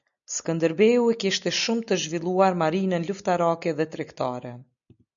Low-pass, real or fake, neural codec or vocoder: 7.2 kHz; real; none